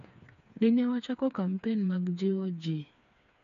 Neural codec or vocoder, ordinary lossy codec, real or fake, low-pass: codec, 16 kHz, 4 kbps, FreqCodec, smaller model; none; fake; 7.2 kHz